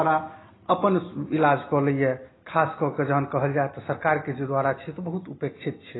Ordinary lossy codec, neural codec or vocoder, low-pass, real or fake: AAC, 16 kbps; none; 7.2 kHz; real